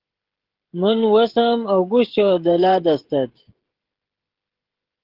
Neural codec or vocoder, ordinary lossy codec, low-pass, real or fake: codec, 16 kHz, 16 kbps, FreqCodec, smaller model; Opus, 16 kbps; 5.4 kHz; fake